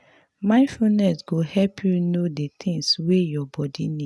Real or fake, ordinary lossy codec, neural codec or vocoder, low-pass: real; none; none; none